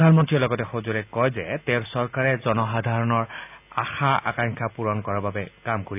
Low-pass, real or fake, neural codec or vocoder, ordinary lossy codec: 3.6 kHz; real; none; AAC, 32 kbps